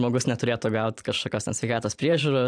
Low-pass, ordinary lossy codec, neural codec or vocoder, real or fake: 9.9 kHz; AAC, 64 kbps; vocoder, 44.1 kHz, 128 mel bands every 512 samples, BigVGAN v2; fake